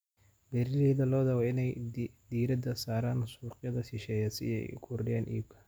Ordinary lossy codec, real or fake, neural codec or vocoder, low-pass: none; real; none; none